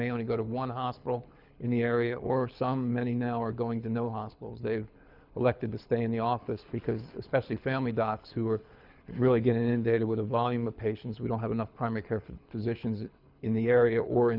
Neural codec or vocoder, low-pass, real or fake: codec, 24 kHz, 6 kbps, HILCodec; 5.4 kHz; fake